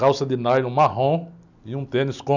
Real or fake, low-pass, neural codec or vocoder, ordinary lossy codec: real; 7.2 kHz; none; none